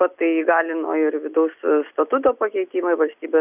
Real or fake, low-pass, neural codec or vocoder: real; 3.6 kHz; none